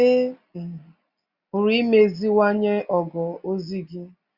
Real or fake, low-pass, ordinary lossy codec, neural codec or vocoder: real; 5.4 kHz; Opus, 64 kbps; none